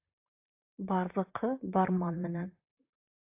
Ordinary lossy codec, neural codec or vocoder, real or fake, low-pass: AAC, 32 kbps; vocoder, 44.1 kHz, 128 mel bands, Pupu-Vocoder; fake; 3.6 kHz